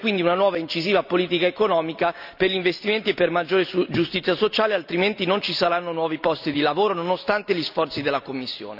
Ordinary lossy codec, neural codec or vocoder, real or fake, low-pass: none; none; real; 5.4 kHz